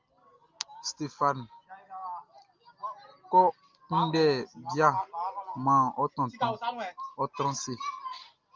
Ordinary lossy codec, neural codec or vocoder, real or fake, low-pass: Opus, 24 kbps; none; real; 7.2 kHz